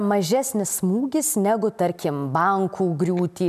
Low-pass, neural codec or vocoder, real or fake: 14.4 kHz; none; real